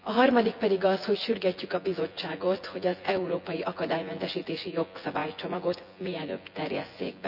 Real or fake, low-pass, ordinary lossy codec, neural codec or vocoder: fake; 5.4 kHz; none; vocoder, 24 kHz, 100 mel bands, Vocos